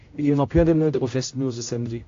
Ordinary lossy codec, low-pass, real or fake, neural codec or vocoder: AAC, 48 kbps; 7.2 kHz; fake; codec, 16 kHz, 0.5 kbps, X-Codec, HuBERT features, trained on general audio